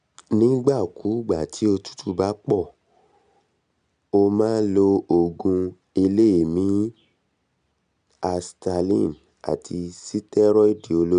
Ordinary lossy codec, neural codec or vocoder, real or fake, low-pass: none; none; real; 9.9 kHz